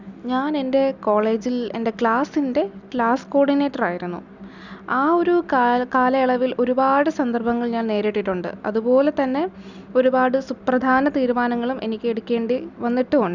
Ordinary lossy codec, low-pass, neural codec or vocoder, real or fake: none; 7.2 kHz; none; real